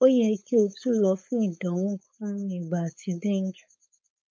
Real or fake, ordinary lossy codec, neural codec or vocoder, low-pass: fake; none; codec, 16 kHz, 4.8 kbps, FACodec; none